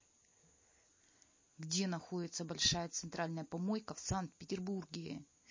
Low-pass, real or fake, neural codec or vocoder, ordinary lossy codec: 7.2 kHz; real; none; MP3, 32 kbps